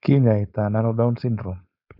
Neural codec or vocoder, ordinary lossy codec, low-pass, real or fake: codec, 16 kHz, 4.8 kbps, FACodec; none; 5.4 kHz; fake